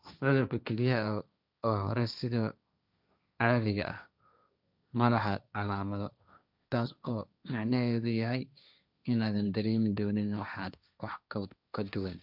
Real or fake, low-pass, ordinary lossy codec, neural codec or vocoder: fake; 5.4 kHz; none; codec, 16 kHz, 1.1 kbps, Voila-Tokenizer